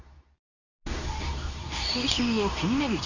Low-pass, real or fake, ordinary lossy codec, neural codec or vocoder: 7.2 kHz; fake; none; codec, 24 kHz, 0.9 kbps, WavTokenizer, medium speech release version 2